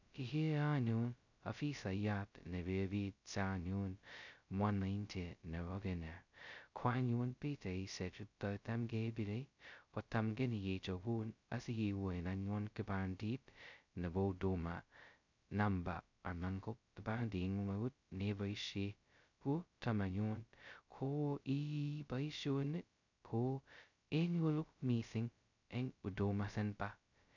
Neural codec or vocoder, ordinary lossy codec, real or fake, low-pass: codec, 16 kHz, 0.2 kbps, FocalCodec; none; fake; 7.2 kHz